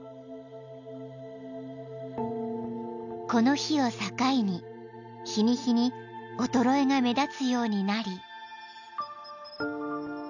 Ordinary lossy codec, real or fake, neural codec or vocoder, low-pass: none; real; none; 7.2 kHz